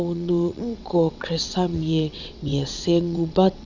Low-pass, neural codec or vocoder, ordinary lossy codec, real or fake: 7.2 kHz; vocoder, 22.05 kHz, 80 mel bands, WaveNeXt; none; fake